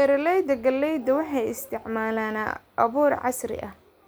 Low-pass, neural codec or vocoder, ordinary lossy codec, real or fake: none; none; none; real